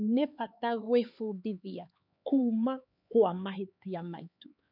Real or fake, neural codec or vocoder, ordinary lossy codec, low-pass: fake; codec, 16 kHz, 4 kbps, X-Codec, HuBERT features, trained on LibriSpeech; none; 5.4 kHz